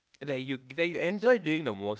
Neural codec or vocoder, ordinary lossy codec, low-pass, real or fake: codec, 16 kHz, 0.8 kbps, ZipCodec; none; none; fake